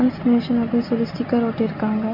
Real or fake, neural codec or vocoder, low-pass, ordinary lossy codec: real; none; 5.4 kHz; MP3, 48 kbps